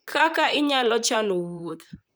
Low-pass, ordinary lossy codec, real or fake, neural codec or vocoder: none; none; fake; vocoder, 44.1 kHz, 128 mel bands, Pupu-Vocoder